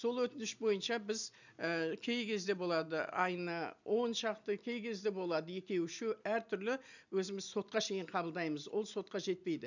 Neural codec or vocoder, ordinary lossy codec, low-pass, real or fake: none; none; 7.2 kHz; real